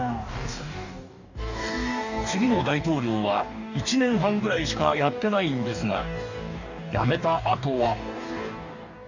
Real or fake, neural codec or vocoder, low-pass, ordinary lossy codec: fake; codec, 44.1 kHz, 2.6 kbps, DAC; 7.2 kHz; none